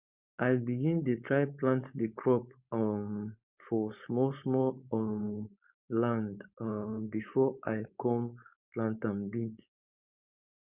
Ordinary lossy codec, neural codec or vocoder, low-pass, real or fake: Opus, 64 kbps; codec, 16 kHz, 4.8 kbps, FACodec; 3.6 kHz; fake